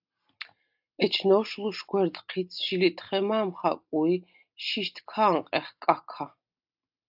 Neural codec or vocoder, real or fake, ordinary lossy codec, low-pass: none; real; AAC, 48 kbps; 5.4 kHz